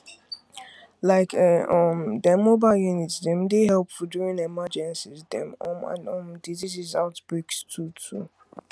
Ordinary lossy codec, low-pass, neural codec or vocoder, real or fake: none; none; none; real